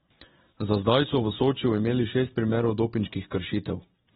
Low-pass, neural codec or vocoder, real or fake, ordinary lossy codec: 19.8 kHz; none; real; AAC, 16 kbps